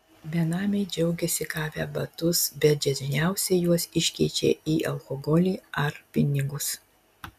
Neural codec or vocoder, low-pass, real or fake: none; 14.4 kHz; real